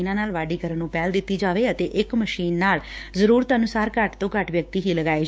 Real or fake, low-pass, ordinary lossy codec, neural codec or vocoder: fake; none; none; codec, 16 kHz, 6 kbps, DAC